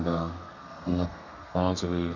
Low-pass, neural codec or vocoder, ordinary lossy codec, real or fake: 7.2 kHz; codec, 32 kHz, 1.9 kbps, SNAC; none; fake